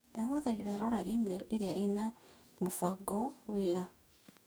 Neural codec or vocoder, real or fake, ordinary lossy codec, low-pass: codec, 44.1 kHz, 2.6 kbps, DAC; fake; none; none